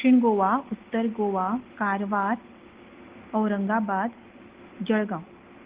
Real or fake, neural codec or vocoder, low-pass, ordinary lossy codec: real; none; 3.6 kHz; Opus, 16 kbps